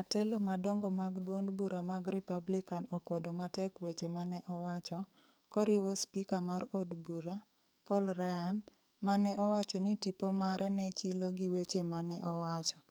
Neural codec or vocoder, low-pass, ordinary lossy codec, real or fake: codec, 44.1 kHz, 2.6 kbps, SNAC; none; none; fake